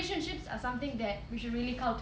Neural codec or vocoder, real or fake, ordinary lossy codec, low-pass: none; real; none; none